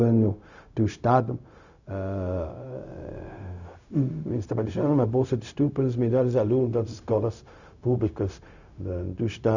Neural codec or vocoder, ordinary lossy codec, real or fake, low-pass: codec, 16 kHz, 0.4 kbps, LongCat-Audio-Codec; none; fake; 7.2 kHz